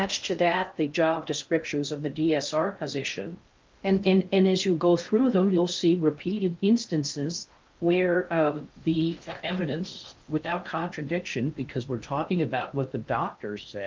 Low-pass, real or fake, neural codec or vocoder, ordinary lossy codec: 7.2 kHz; fake; codec, 16 kHz in and 24 kHz out, 0.6 kbps, FocalCodec, streaming, 4096 codes; Opus, 24 kbps